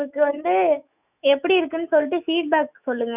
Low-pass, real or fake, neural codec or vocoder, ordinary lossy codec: 3.6 kHz; fake; autoencoder, 48 kHz, 128 numbers a frame, DAC-VAE, trained on Japanese speech; none